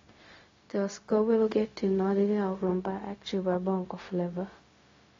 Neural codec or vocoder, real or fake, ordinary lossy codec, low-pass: codec, 16 kHz, 0.4 kbps, LongCat-Audio-Codec; fake; AAC, 32 kbps; 7.2 kHz